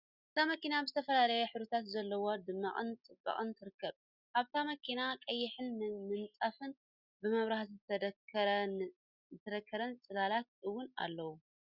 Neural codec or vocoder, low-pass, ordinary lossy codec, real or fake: none; 5.4 kHz; Opus, 64 kbps; real